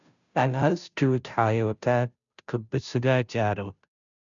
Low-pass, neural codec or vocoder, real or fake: 7.2 kHz; codec, 16 kHz, 0.5 kbps, FunCodec, trained on Chinese and English, 25 frames a second; fake